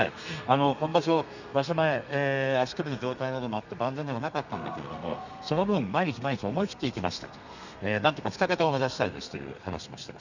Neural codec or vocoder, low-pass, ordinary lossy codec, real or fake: codec, 32 kHz, 1.9 kbps, SNAC; 7.2 kHz; none; fake